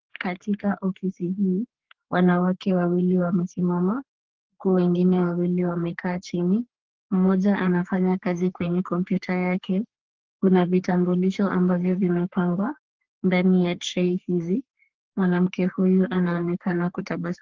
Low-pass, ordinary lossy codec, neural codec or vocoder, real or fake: 7.2 kHz; Opus, 16 kbps; codec, 44.1 kHz, 3.4 kbps, Pupu-Codec; fake